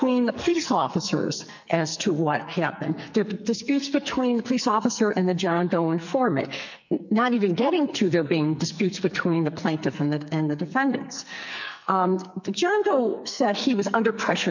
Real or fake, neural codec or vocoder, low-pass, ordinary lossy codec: fake; codec, 44.1 kHz, 2.6 kbps, SNAC; 7.2 kHz; MP3, 64 kbps